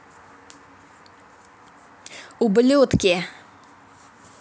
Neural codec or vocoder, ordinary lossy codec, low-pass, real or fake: none; none; none; real